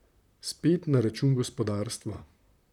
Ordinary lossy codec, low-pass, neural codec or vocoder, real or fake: none; 19.8 kHz; vocoder, 44.1 kHz, 128 mel bands, Pupu-Vocoder; fake